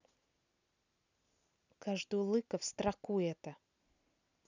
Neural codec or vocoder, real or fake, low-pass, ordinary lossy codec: none; real; 7.2 kHz; none